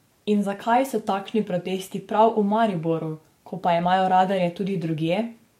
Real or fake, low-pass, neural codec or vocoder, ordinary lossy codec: fake; 19.8 kHz; codec, 44.1 kHz, 7.8 kbps, DAC; MP3, 64 kbps